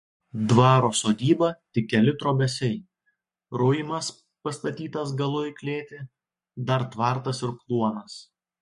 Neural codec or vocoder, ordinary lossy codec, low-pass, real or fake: codec, 44.1 kHz, 7.8 kbps, Pupu-Codec; MP3, 48 kbps; 14.4 kHz; fake